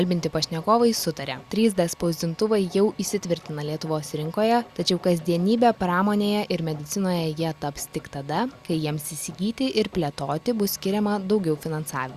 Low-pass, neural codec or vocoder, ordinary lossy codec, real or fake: 14.4 kHz; none; Opus, 64 kbps; real